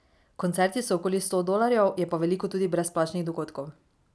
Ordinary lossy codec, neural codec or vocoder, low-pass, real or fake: none; none; none; real